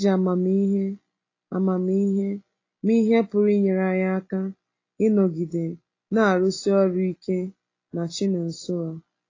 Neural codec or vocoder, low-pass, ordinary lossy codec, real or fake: none; 7.2 kHz; AAC, 32 kbps; real